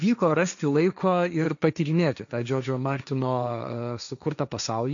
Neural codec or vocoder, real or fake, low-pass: codec, 16 kHz, 1.1 kbps, Voila-Tokenizer; fake; 7.2 kHz